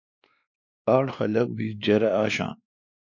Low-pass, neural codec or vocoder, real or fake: 7.2 kHz; codec, 16 kHz, 2 kbps, X-Codec, WavLM features, trained on Multilingual LibriSpeech; fake